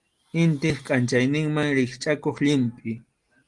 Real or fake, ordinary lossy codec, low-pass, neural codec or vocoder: real; Opus, 24 kbps; 10.8 kHz; none